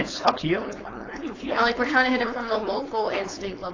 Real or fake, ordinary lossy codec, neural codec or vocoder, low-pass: fake; AAC, 32 kbps; codec, 16 kHz, 4.8 kbps, FACodec; 7.2 kHz